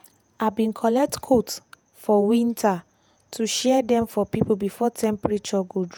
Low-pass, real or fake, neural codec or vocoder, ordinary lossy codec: none; fake; vocoder, 48 kHz, 128 mel bands, Vocos; none